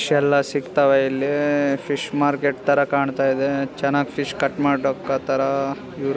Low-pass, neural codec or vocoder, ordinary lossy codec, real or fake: none; none; none; real